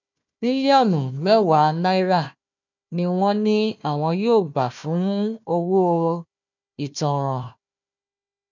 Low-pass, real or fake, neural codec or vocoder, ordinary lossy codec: 7.2 kHz; fake; codec, 16 kHz, 1 kbps, FunCodec, trained on Chinese and English, 50 frames a second; none